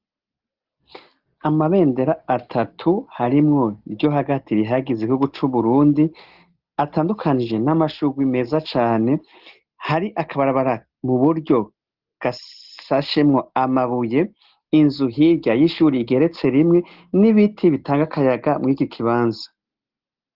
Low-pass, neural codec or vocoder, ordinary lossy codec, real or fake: 5.4 kHz; none; Opus, 16 kbps; real